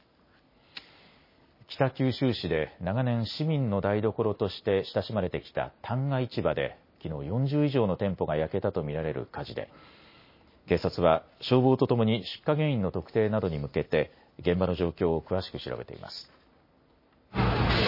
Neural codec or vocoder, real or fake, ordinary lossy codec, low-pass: none; real; MP3, 24 kbps; 5.4 kHz